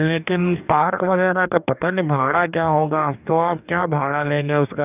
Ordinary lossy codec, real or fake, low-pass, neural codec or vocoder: none; fake; 3.6 kHz; codec, 44.1 kHz, 2.6 kbps, DAC